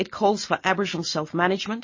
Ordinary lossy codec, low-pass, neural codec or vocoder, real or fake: MP3, 32 kbps; 7.2 kHz; none; real